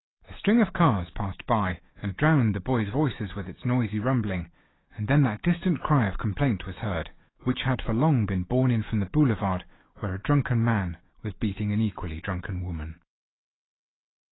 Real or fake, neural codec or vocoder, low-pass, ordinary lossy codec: fake; autoencoder, 48 kHz, 128 numbers a frame, DAC-VAE, trained on Japanese speech; 7.2 kHz; AAC, 16 kbps